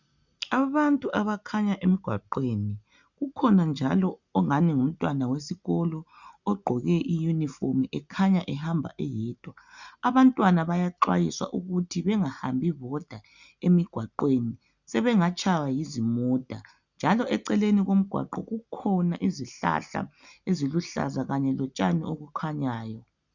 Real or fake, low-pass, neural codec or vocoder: real; 7.2 kHz; none